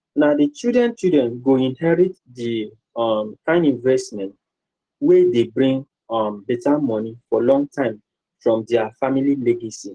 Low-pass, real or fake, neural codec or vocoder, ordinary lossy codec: 9.9 kHz; real; none; Opus, 16 kbps